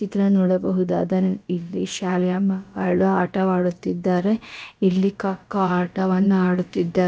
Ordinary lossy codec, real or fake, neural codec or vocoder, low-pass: none; fake; codec, 16 kHz, about 1 kbps, DyCAST, with the encoder's durations; none